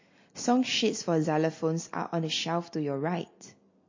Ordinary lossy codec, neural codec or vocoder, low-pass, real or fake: MP3, 32 kbps; none; 7.2 kHz; real